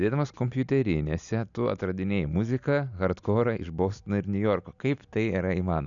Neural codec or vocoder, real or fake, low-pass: none; real; 7.2 kHz